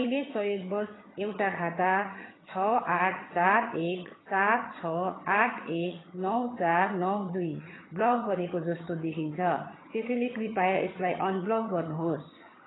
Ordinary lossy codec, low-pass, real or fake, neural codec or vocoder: AAC, 16 kbps; 7.2 kHz; fake; vocoder, 22.05 kHz, 80 mel bands, HiFi-GAN